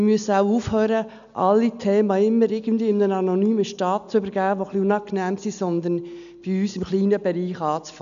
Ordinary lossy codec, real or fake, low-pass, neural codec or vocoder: none; real; 7.2 kHz; none